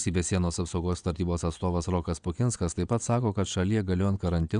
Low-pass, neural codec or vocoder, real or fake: 9.9 kHz; vocoder, 22.05 kHz, 80 mel bands, WaveNeXt; fake